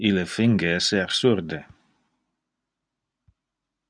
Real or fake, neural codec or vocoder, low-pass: real; none; 9.9 kHz